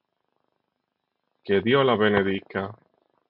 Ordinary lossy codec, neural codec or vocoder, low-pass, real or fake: MP3, 48 kbps; none; 5.4 kHz; real